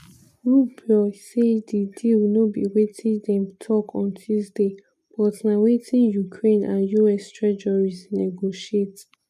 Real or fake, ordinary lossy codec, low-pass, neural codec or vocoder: real; none; 14.4 kHz; none